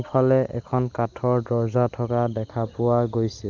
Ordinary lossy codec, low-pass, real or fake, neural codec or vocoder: Opus, 32 kbps; 7.2 kHz; real; none